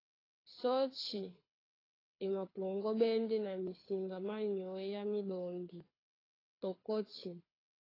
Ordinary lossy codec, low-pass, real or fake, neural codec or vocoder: AAC, 24 kbps; 5.4 kHz; fake; codec, 24 kHz, 6 kbps, HILCodec